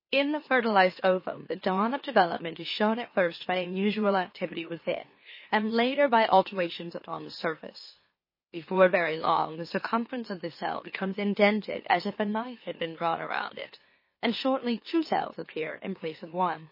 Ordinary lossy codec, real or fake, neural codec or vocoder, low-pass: MP3, 24 kbps; fake; autoencoder, 44.1 kHz, a latent of 192 numbers a frame, MeloTTS; 5.4 kHz